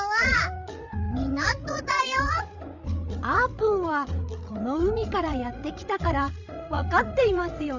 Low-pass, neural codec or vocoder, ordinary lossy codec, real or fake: 7.2 kHz; codec, 16 kHz, 8 kbps, FreqCodec, larger model; none; fake